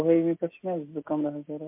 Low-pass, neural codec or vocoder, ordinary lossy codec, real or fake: 3.6 kHz; none; MP3, 32 kbps; real